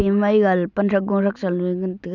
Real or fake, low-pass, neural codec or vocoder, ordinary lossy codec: real; 7.2 kHz; none; none